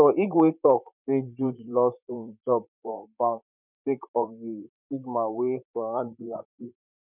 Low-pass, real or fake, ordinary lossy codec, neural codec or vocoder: 3.6 kHz; fake; none; vocoder, 44.1 kHz, 128 mel bands, Pupu-Vocoder